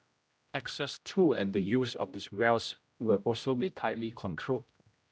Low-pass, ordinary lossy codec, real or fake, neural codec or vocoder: none; none; fake; codec, 16 kHz, 0.5 kbps, X-Codec, HuBERT features, trained on general audio